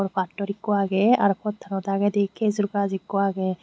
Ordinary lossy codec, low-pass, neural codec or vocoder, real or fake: none; none; none; real